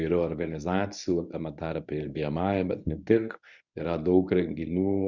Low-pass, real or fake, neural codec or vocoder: 7.2 kHz; fake; codec, 24 kHz, 0.9 kbps, WavTokenizer, medium speech release version 1